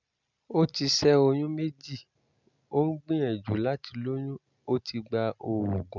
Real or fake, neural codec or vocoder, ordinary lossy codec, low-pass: real; none; none; 7.2 kHz